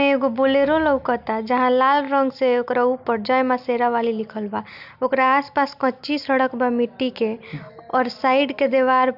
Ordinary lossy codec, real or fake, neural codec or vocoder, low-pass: none; real; none; 5.4 kHz